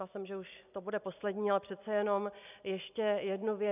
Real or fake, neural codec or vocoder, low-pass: real; none; 3.6 kHz